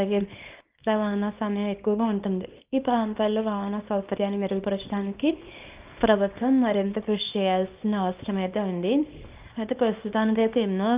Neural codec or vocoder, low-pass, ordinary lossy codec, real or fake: codec, 24 kHz, 0.9 kbps, WavTokenizer, small release; 3.6 kHz; Opus, 24 kbps; fake